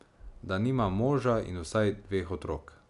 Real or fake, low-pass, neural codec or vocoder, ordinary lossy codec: real; 10.8 kHz; none; none